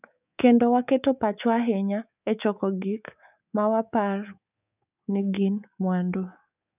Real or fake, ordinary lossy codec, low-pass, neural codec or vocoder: fake; none; 3.6 kHz; codec, 24 kHz, 3.1 kbps, DualCodec